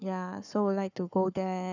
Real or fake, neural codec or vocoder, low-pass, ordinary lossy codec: fake; codec, 44.1 kHz, 7.8 kbps, Pupu-Codec; 7.2 kHz; none